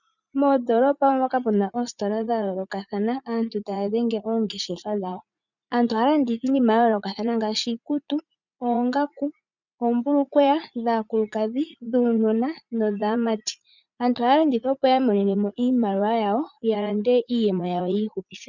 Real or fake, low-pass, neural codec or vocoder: fake; 7.2 kHz; vocoder, 44.1 kHz, 80 mel bands, Vocos